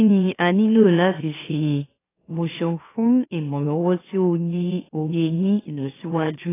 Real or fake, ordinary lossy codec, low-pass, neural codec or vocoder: fake; AAC, 16 kbps; 3.6 kHz; autoencoder, 44.1 kHz, a latent of 192 numbers a frame, MeloTTS